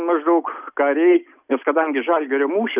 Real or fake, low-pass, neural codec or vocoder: real; 3.6 kHz; none